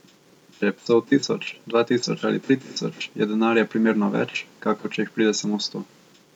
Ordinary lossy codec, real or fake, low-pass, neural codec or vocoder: none; real; 19.8 kHz; none